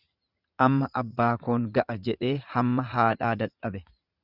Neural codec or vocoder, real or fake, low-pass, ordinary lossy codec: vocoder, 44.1 kHz, 80 mel bands, Vocos; fake; 5.4 kHz; Opus, 64 kbps